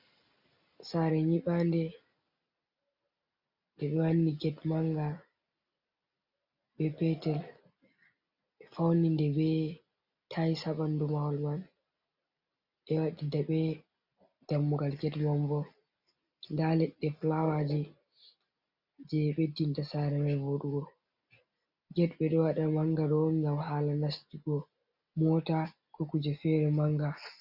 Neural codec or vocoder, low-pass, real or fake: none; 5.4 kHz; real